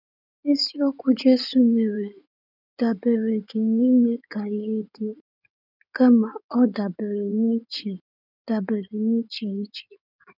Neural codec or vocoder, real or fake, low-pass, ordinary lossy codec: codec, 16 kHz in and 24 kHz out, 2.2 kbps, FireRedTTS-2 codec; fake; 5.4 kHz; none